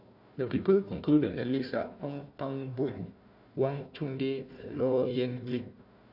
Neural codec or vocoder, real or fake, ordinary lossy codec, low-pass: codec, 16 kHz, 1 kbps, FunCodec, trained on Chinese and English, 50 frames a second; fake; none; 5.4 kHz